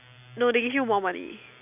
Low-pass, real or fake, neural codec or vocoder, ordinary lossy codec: 3.6 kHz; real; none; none